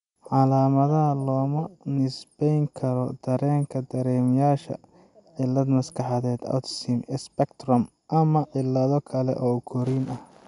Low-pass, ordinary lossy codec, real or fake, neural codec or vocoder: 10.8 kHz; none; real; none